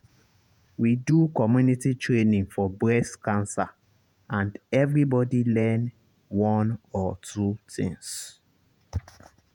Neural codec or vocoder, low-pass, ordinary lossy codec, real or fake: vocoder, 48 kHz, 128 mel bands, Vocos; 19.8 kHz; none; fake